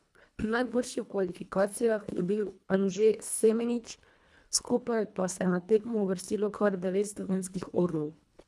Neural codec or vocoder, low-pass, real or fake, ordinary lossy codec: codec, 24 kHz, 1.5 kbps, HILCodec; none; fake; none